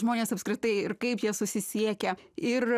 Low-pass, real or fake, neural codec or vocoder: 14.4 kHz; real; none